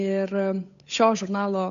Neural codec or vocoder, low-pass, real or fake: codec, 16 kHz, 8 kbps, FunCodec, trained on Chinese and English, 25 frames a second; 7.2 kHz; fake